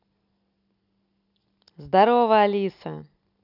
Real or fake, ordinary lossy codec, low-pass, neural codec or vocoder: real; none; 5.4 kHz; none